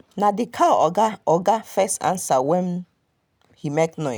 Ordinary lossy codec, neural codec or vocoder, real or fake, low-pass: none; none; real; none